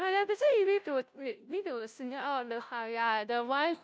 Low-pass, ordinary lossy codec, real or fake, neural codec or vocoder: none; none; fake; codec, 16 kHz, 0.5 kbps, FunCodec, trained on Chinese and English, 25 frames a second